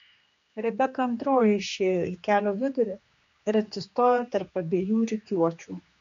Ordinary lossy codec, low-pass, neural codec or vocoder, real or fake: MP3, 48 kbps; 7.2 kHz; codec, 16 kHz, 2 kbps, X-Codec, HuBERT features, trained on general audio; fake